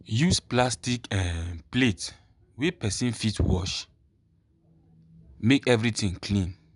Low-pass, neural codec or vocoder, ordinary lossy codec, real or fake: 10.8 kHz; none; none; real